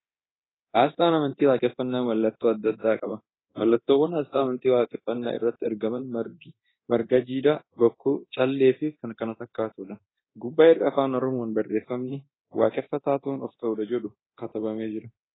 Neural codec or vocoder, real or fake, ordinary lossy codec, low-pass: codec, 24 kHz, 0.9 kbps, DualCodec; fake; AAC, 16 kbps; 7.2 kHz